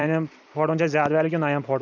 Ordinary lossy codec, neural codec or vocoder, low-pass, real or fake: none; vocoder, 44.1 kHz, 128 mel bands every 256 samples, BigVGAN v2; 7.2 kHz; fake